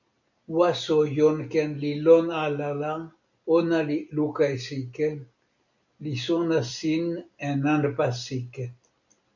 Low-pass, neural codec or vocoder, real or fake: 7.2 kHz; none; real